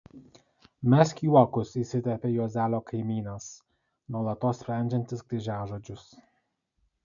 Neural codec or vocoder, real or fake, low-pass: none; real; 7.2 kHz